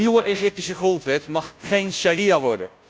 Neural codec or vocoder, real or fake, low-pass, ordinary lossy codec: codec, 16 kHz, 0.5 kbps, FunCodec, trained on Chinese and English, 25 frames a second; fake; none; none